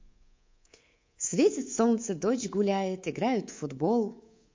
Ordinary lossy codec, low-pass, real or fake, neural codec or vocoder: MP3, 48 kbps; 7.2 kHz; fake; codec, 24 kHz, 3.1 kbps, DualCodec